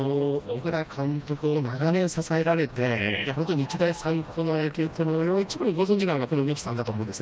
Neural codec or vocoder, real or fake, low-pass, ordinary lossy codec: codec, 16 kHz, 1 kbps, FreqCodec, smaller model; fake; none; none